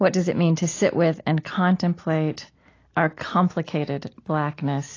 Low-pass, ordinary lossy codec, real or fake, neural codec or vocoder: 7.2 kHz; AAC, 32 kbps; real; none